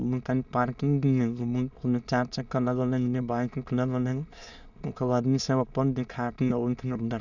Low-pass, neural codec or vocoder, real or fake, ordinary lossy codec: 7.2 kHz; autoencoder, 22.05 kHz, a latent of 192 numbers a frame, VITS, trained on many speakers; fake; none